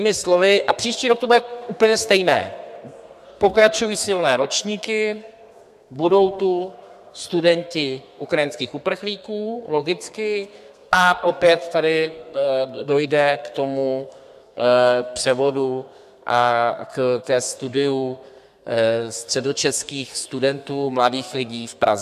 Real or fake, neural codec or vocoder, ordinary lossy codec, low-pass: fake; codec, 32 kHz, 1.9 kbps, SNAC; MP3, 96 kbps; 14.4 kHz